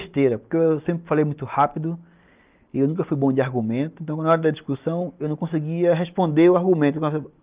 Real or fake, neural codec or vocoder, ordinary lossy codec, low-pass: real; none; Opus, 24 kbps; 3.6 kHz